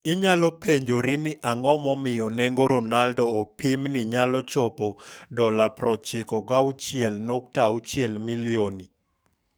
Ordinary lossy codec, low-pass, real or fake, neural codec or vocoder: none; none; fake; codec, 44.1 kHz, 2.6 kbps, SNAC